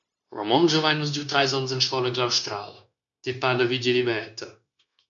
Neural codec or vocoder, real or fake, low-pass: codec, 16 kHz, 0.9 kbps, LongCat-Audio-Codec; fake; 7.2 kHz